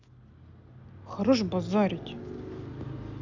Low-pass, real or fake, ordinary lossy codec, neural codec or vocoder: 7.2 kHz; real; none; none